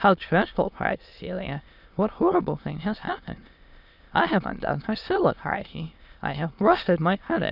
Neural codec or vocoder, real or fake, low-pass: autoencoder, 22.05 kHz, a latent of 192 numbers a frame, VITS, trained on many speakers; fake; 5.4 kHz